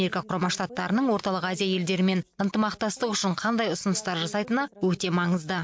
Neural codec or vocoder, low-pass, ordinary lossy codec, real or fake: none; none; none; real